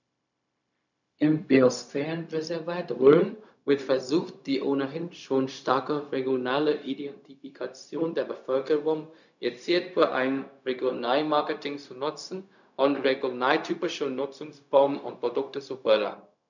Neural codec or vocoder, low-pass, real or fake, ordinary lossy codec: codec, 16 kHz, 0.4 kbps, LongCat-Audio-Codec; 7.2 kHz; fake; none